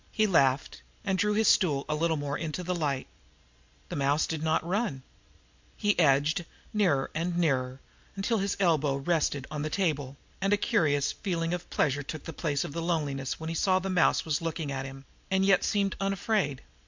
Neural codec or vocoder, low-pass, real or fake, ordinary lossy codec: none; 7.2 kHz; real; MP3, 64 kbps